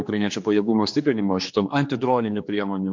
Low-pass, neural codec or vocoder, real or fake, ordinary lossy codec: 7.2 kHz; codec, 16 kHz, 2 kbps, X-Codec, HuBERT features, trained on general audio; fake; MP3, 48 kbps